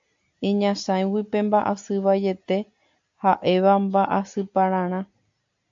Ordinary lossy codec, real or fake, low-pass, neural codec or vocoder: AAC, 64 kbps; real; 7.2 kHz; none